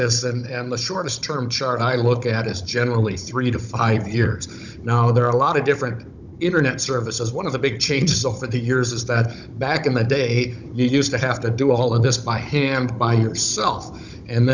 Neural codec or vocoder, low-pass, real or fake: codec, 16 kHz, 16 kbps, FunCodec, trained on Chinese and English, 50 frames a second; 7.2 kHz; fake